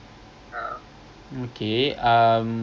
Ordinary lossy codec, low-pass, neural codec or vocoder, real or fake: none; none; none; real